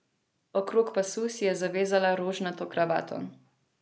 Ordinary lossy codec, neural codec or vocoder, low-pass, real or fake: none; none; none; real